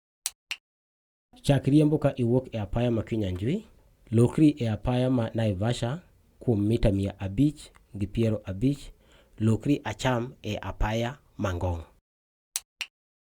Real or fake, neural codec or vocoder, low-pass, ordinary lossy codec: real; none; 19.8 kHz; Opus, 64 kbps